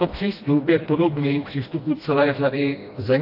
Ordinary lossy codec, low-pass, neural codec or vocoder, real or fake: AAC, 32 kbps; 5.4 kHz; codec, 16 kHz, 1 kbps, FreqCodec, smaller model; fake